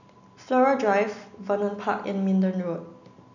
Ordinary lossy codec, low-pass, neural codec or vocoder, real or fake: none; 7.2 kHz; none; real